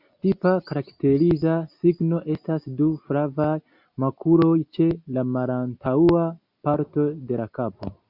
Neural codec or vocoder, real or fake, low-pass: none; real; 5.4 kHz